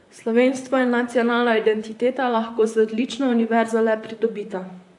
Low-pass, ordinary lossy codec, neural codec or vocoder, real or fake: 10.8 kHz; AAC, 64 kbps; vocoder, 44.1 kHz, 128 mel bands, Pupu-Vocoder; fake